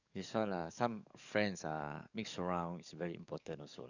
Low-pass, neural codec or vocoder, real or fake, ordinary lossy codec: 7.2 kHz; codec, 44.1 kHz, 7.8 kbps, DAC; fake; none